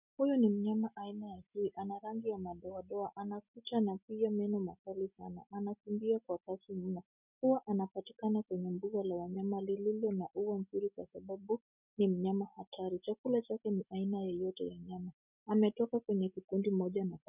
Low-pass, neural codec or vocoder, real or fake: 3.6 kHz; none; real